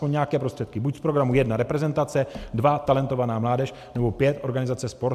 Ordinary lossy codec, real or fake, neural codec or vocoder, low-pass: AAC, 96 kbps; real; none; 14.4 kHz